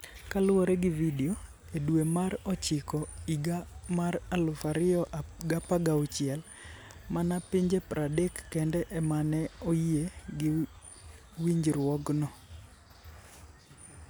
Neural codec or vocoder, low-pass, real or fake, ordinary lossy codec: none; none; real; none